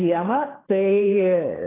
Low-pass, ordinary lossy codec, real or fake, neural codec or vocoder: 3.6 kHz; AAC, 16 kbps; fake; codec, 16 kHz, 4 kbps, FreqCodec, larger model